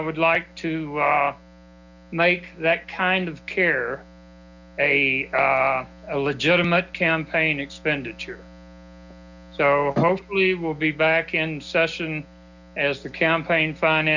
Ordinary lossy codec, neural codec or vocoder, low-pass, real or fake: Opus, 64 kbps; none; 7.2 kHz; real